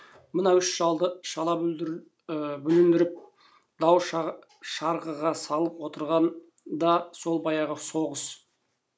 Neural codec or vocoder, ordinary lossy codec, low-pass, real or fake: none; none; none; real